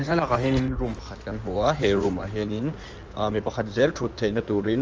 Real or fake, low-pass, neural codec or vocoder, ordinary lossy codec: fake; 7.2 kHz; codec, 16 kHz in and 24 kHz out, 2.2 kbps, FireRedTTS-2 codec; Opus, 24 kbps